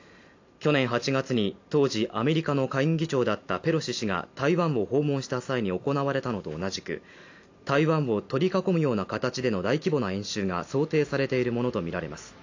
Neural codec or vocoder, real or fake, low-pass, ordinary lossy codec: none; real; 7.2 kHz; AAC, 48 kbps